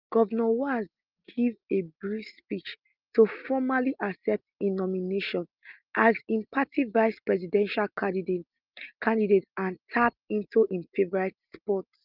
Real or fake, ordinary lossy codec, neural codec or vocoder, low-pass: real; Opus, 32 kbps; none; 5.4 kHz